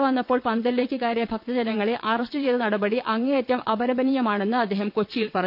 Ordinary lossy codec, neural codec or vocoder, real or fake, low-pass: none; vocoder, 22.05 kHz, 80 mel bands, WaveNeXt; fake; 5.4 kHz